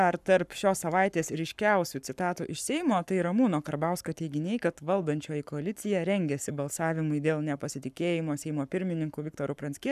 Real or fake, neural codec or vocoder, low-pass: fake; codec, 44.1 kHz, 7.8 kbps, Pupu-Codec; 14.4 kHz